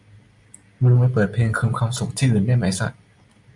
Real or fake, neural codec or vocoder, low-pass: real; none; 10.8 kHz